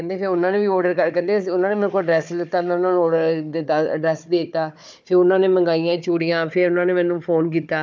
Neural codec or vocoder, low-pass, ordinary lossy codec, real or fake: codec, 16 kHz, 4 kbps, FunCodec, trained on Chinese and English, 50 frames a second; none; none; fake